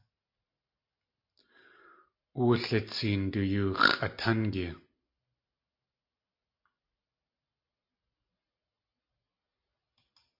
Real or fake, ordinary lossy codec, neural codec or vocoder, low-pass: real; MP3, 32 kbps; none; 5.4 kHz